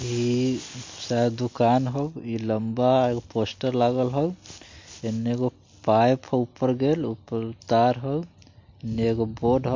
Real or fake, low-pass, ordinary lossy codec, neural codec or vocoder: real; 7.2 kHz; MP3, 48 kbps; none